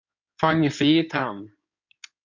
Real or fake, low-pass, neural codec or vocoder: fake; 7.2 kHz; codec, 16 kHz in and 24 kHz out, 2.2 kbps, FireRedTTS-2 codec